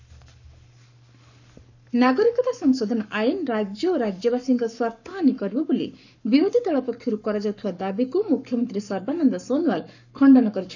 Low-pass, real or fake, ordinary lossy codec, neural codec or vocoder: 7.2 kHz; fake; none; codec, 44.1 kHz, 7.8 kbps, Pupu-Codec